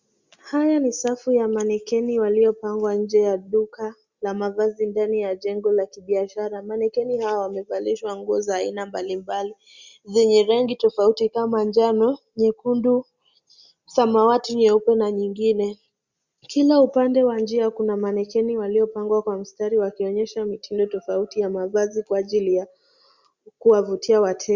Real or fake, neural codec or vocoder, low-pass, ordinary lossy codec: real; none; 7.2 kHz; Opus, 64 kbps